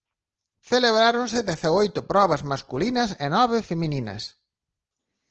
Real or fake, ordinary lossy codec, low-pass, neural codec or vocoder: real; Opus, 16 kbps; 7.2 kHz; none